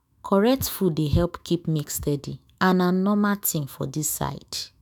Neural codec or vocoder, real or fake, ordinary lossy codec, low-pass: autoencoder, 48 kHz, 128 numbers a frame, DAC-VAE, trained on Japanese speech; fake; none; none